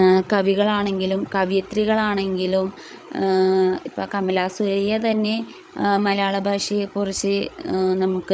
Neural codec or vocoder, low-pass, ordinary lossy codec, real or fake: codec, 16 kHz, 8 kbps, FreqCodec, larger model; none; none; fake